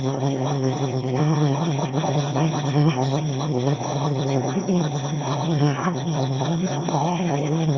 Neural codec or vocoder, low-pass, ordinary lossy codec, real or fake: autoencoder, 22.05 kHz, a latent of 192 numbers a frame, VITS, trained on one speaker; 7.2 kHz; Opus, 64 kbps; fake